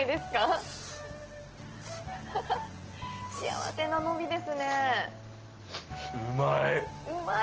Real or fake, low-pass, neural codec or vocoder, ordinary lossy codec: real; 7.2 kHz; none; Opus, 16 kbps